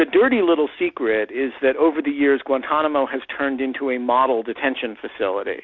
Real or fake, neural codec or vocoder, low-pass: real; none; 7.2 kHz